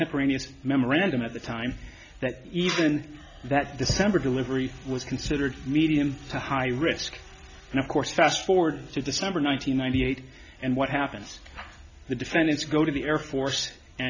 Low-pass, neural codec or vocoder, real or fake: 7.2 kHz; none; real